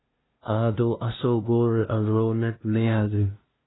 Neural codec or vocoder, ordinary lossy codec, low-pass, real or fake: codec, 16 kHz, 0.5 kbps, FunCodec, trained on LibriTTS, 25 frames a second; AAC, 16 kbps; 7.2 kHz; fake